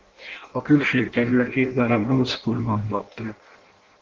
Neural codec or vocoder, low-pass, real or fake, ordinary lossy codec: codec, 16 kHz in and 24 kHz out, 0.6 kbps, FireRedTTS-2 codec; 7.2 kHz; fake; Opus, 16 kbps